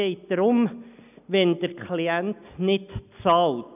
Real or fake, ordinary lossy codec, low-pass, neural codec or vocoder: real; none; 3.6 kHz; none